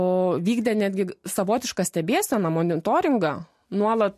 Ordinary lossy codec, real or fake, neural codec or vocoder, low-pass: MP3, 64 kbps; real; none; 14.4 kHz